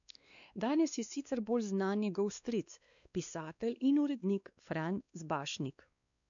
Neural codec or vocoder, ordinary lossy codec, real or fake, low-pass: codec, 16 kHz, 2 kbps, X-Codec, WavLM features, trained on Multilingual LibriSpeech; none; fake; 7.2 kHz